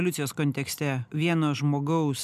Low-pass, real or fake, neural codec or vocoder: 14.4 kHz; real; none